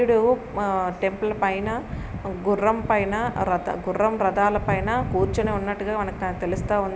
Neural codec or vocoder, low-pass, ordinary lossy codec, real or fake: none; none; none; real